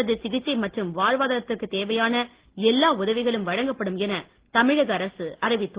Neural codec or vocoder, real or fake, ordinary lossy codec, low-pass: none; real; Opus, 16 kbps; 3.6 kHz